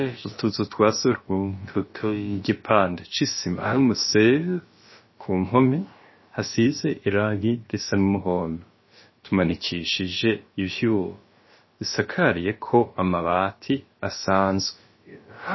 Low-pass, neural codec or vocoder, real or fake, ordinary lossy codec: 7.2 kHz; codec, 16 kHz, about 1 kbps, DyCAST, with the encoder's durations; fake; MP3, 24 kbps